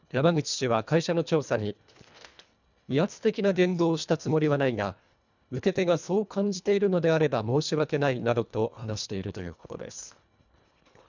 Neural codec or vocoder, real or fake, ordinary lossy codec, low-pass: codec, 24 kHz, 1.5 kbps, HILCodec; fake; none; 7.2 kHz